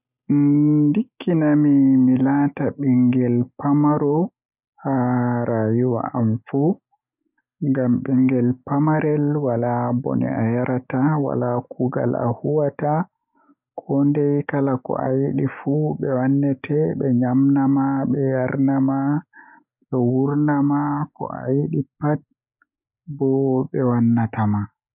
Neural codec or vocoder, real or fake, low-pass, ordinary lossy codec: none; real; 3.6 kHz; none